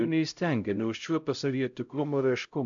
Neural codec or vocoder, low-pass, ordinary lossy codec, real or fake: codec, 16 kHz, 0.5 kbps, X-Codec, HuBERT features, trained on LibriSpeech; 7.2 kHz; AAC, 64 kbps; fake